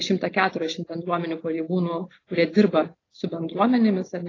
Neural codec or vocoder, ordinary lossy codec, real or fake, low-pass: none; AAC, 32 kbps; real; 7.2 kHz